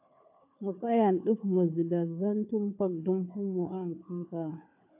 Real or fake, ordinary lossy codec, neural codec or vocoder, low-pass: fake; AAC, 32 kbps; codec, 16 kHz, 4 kbps, FunCodec, trained on Chinese and English, 50 frames a second; 3.6 kHz